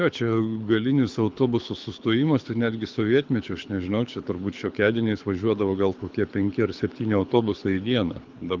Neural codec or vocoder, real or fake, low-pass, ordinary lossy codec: codec, 24 kHz, 6 kbps, HILCodec; fake; 7.2 kHz; Opus, 32 kbps